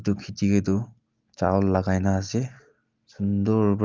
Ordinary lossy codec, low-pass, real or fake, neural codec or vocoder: Opus, 32 kbps; 7.2 kHz; real; none